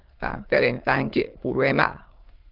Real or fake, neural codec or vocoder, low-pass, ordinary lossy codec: fake; autoencoder, 22.05 kHz, a latent of 192 numbers a frame, VITS, trained on many speakers; 5.4 kHz; Opus, 16 kbps